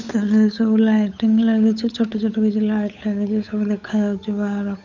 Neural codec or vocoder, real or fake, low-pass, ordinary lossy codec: codec, 16 kHz, 8 kbps, FunCodec, trained on Chinese and English, 25 frames a second; fake; 7.2 kHz; none